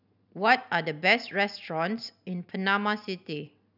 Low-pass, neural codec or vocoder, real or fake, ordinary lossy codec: 5.4 kHz; none; real; none